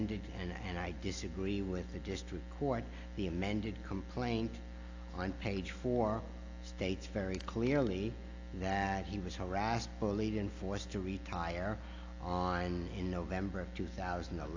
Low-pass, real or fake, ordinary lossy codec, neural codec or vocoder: 7.2 kHz; real; AAC, 48 kbps; none